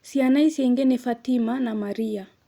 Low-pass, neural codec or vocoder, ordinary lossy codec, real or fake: 19.8 kHz; none; Opus, 64 kbps; real